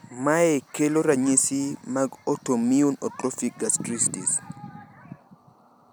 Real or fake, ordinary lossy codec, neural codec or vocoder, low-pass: real; none; none; none